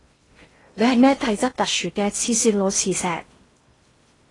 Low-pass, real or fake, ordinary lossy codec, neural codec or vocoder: 10.8 kHz; fake; AAC, 32 kbps; codec, 16 kHz in and 24 kHz out, 0.6 kbps, FocalCodec, streaming, 2048 codes